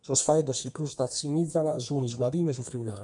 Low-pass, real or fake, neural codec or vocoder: 10.8 kHz; fake; codec, 32 kHz, 1.9 kbps, SNAC